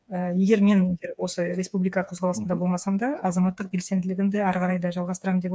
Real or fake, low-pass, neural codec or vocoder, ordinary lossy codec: fake; none; codec, 16 kHz, 4 kbps, FreqCodec, smaller model; none